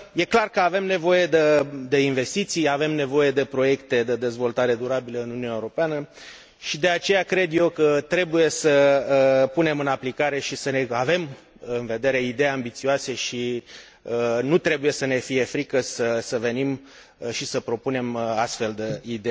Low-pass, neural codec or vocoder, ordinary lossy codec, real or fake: none; none; none; real